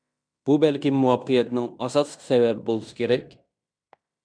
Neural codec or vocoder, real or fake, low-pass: codec, 16 kHz in and 24 kHz out, 0.9 kbps, LongCat-Audio-Codec, fine tuned four codebook decoder; fake; 9.9 kHz